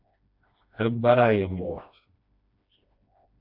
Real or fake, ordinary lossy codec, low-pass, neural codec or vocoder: fake; AAC, 32 kbps; 5.4 kHz; codec, 16 kHz, 1 kbps, FreqCodec, smaller model